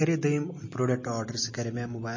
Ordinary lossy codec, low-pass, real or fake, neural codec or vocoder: MP3, 32 kbps; 7.2 kHz; real; none